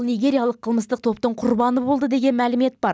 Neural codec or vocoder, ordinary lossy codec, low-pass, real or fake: none; none; none; real